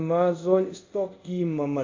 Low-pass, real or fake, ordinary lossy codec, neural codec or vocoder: 7.2 kHz; fake; MP3, 48 kbps; codec, 24 kHz, 0.5 kbps, DualCodec